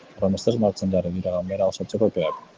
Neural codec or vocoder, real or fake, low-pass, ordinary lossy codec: none; real; 7.2 kHz; Opus, 16 kbps